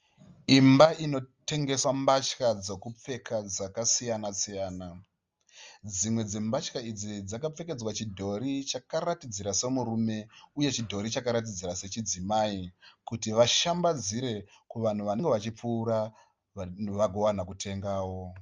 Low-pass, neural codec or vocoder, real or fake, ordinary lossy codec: 7.2 kHz; none; real; Opus, 32 kbps